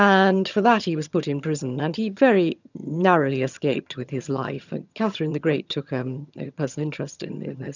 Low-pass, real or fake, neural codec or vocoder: 7.2 kHz; fake; vocoder, 22.05 kHz, 80 mel bands, HiFi-GAN